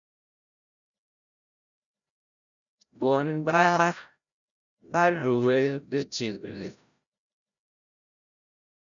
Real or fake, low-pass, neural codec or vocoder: fake; 7.2 kHz; codec, 16 kHz, 0.5 kbps, FreqCodec, larger model